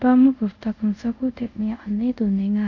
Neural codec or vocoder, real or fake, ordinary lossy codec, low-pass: codec, 24 kHz, 0.5 kbps, DualCodec; fake; none; 7.2 kHz